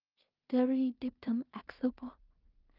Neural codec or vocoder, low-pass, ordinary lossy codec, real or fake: codec, 16 kHz in and 24 kHz out, 0.4 kbps, LongCat-Audio-Codec, two codebook decoder; 5.4 kHz; Opus, 24 kbps; fake